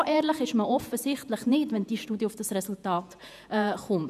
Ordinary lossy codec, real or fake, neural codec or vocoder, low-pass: none; fake; vocoder, 48 kHz, 128 mel bands, Vocos; 14.4 kHz